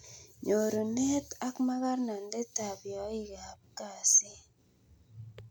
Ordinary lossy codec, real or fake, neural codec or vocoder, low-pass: none; real; none; none